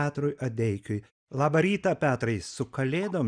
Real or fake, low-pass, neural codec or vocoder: real; 9.9 kHz; none